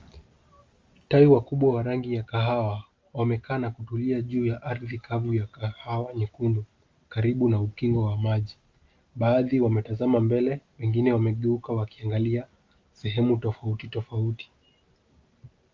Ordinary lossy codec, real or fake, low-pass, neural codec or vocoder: Opus, 32 kbps; real; 7.2 kHz; none